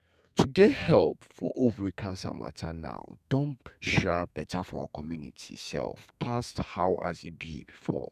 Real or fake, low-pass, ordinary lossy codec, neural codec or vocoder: fake; 14.4 kHz; none; codec, 32 kHz, 1.9 kbps, SNAC